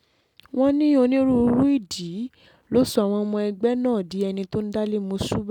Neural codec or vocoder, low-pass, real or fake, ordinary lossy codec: none; 19.8 kHz; real; none